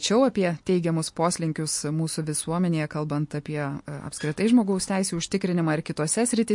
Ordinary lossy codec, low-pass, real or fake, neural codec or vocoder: MP3, 48 kbps; 10.8 kHz; real; none